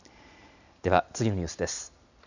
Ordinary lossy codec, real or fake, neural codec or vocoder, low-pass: none; real; none; 7.2 kHz